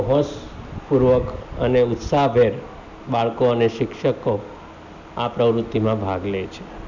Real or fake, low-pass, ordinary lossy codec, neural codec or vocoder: real; 7.2 kHz; none; none